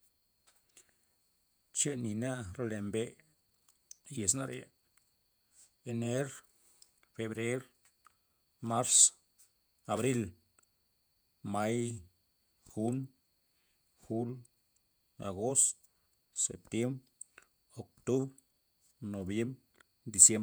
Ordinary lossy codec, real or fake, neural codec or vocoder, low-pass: none; fake; vocoder, 48 kHz, 128 mel bands, Vocos; none